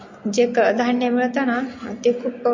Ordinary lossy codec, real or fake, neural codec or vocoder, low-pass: MP3, 32 kbps; real; none; 7.2 kHz